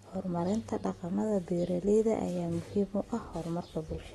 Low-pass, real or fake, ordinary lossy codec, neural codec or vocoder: 19.8 kHz; real; AAC, 32 kbps; none